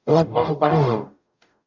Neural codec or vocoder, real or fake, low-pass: codec, 44.1 kHz, 0.9 kbps, DAC; fake; 7.2 kHz